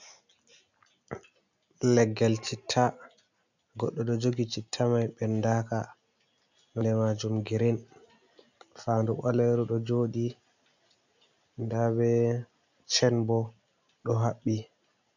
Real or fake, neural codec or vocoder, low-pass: real; none; 7.2 kHz